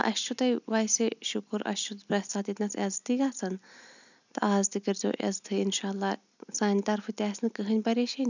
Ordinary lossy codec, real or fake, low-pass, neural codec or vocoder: none; real; 7.2 kHz; none